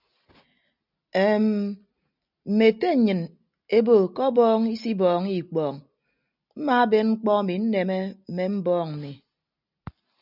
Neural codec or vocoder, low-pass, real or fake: none; 5.4 kHz; real